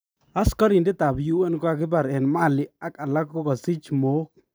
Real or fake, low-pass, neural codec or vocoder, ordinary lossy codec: real; none; none; none